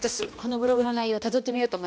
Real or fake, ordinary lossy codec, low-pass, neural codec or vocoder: fake; none; none; codec, 16 kHz, 1 kbps, X-Codec, HuBERT features, trained on balanced general audio